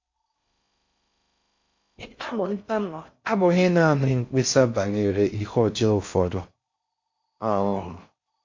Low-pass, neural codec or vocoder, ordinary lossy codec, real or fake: 7.2 kHz; codec, 16 kHz in and 24 kHz out, 0.6 kbps, FocalCodec, streaming, 4096 codes; MP3, 48 kbps; fake